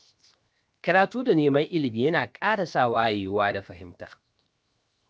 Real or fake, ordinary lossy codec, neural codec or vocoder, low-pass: fake; none; codec, 16 kHz, 0.7 kbps, FocalCodec; none